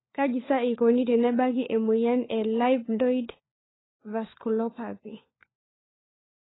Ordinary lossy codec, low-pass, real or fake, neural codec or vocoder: AAC, 16 kbps; 7.2 kHz; fake; codec, 16 kHz, 4 kbps, FunCodec, trained on LibriTTS, 50 frames a second